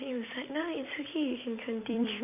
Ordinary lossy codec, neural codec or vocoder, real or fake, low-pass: none; vocoder, 44.1 kHz, 128 mel bands every 256 samples, BigVGAN v2; fake; 3.6 kHz